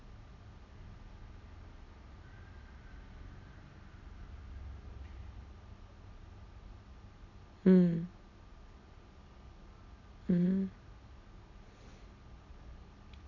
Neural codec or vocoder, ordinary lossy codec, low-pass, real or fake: none; none; 7.2 kHz; real